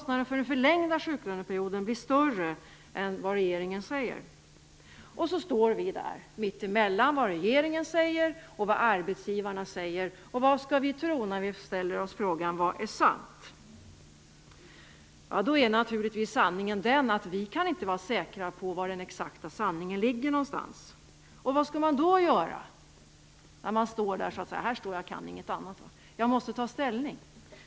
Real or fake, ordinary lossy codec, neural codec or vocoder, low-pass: real; none; none; none